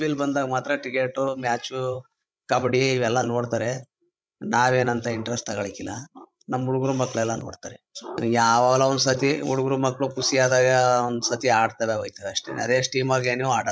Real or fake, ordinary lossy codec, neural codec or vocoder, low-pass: fake; none; codec, 16 kHz, 16 kbps, FreqCodec, larger model; none